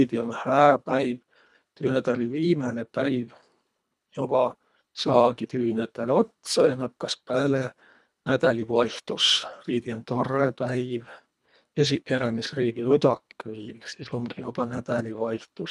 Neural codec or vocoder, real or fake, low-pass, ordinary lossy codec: codec, 24 kHz, 1.5 kbps, HILCodec; fake; none; none